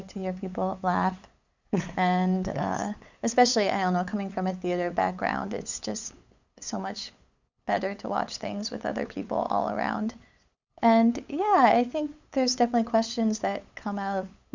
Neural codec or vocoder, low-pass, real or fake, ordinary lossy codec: codec, 16 kHz, 8 kbps, FunCodec, trained on LibriTTS, 25 frames a second; 7.2 kHz; fake; Opus, 64 kbps